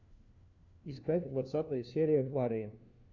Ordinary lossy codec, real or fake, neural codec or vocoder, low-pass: Opus, 64 kbps; fake; codec, 16 kHz, 1 kbps, FunCodec, trained on LibriTTS, 50 frames a second; 7.2 kHz